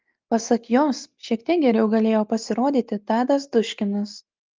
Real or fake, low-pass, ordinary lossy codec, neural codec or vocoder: real; 7.2 kHz; Opus, 32 kbps; none